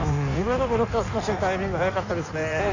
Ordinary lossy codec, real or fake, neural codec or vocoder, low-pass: none; fake; codec, 16 kHz in and 24 kHz out, 1.1 kbps, FireRedTTS-2 codec; 7.2 kHz